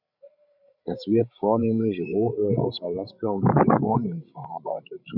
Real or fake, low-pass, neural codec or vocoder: fake; 5.4 kHz; codec, 16 kHz, 16 kbps, FreqCodec, larger model